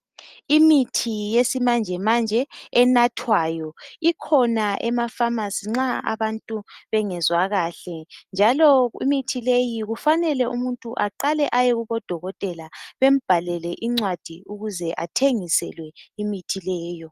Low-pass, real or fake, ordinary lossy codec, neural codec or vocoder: 14.4 kHz; real; Opus, 24 kbps; none